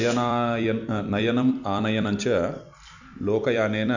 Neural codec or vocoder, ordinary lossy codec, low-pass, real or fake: vocoder, 44.1 kHz, 128 mel bands every 256 samples, BigVGAN v2; none; 7.2 kHz; fake